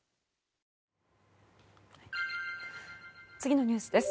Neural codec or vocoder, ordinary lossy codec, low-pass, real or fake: none; none; none; real